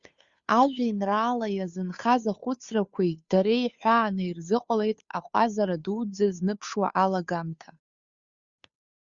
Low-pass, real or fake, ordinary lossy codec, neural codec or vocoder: 7.2 kHz; fake; Opus, 64 kbps; codec, 16 kHz, 2 kbps, FunCodec, trained on Chinese and English, 25 frames a second